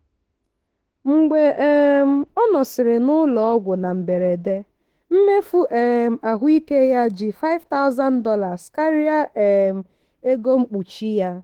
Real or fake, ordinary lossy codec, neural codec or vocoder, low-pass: fake; Opus, 16 kbps; autoencoder, 48 kHz, 32 numbers a frame, DAC-VAE, trained on Japanese speech; 19.8 kHz